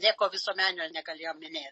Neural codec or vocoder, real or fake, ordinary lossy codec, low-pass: none; real; MP3, 32 kbps; 10.8 kHz